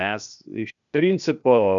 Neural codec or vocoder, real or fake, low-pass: codec, 16 kHz, 0.8 kbps, ZipCodec; fake; 7.2 kHz